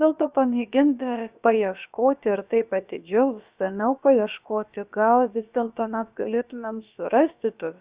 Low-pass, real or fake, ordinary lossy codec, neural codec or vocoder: 3.6 kHz; fake; Opus, 64 kbps; codec, 16 kHz, about 1 kbps, DyCAST, with the encoder's durations